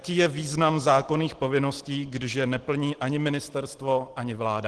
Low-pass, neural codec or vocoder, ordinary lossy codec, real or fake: 10.8 kHz; none; Opus, 16 kbps; real